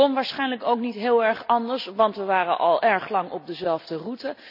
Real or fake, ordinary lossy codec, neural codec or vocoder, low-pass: real; none; none; 5.4 kHz